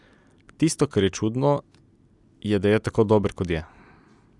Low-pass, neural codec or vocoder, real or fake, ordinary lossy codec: 10.8 kHz; none; real; none